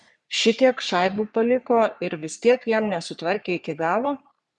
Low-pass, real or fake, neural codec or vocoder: 10.8 kHz; fake; codec, 44.1 kHz, 3.4 kbps, Pupu-Codec